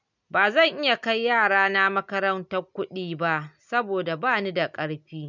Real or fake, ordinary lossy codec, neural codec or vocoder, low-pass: real; none; none; 7.2 kHz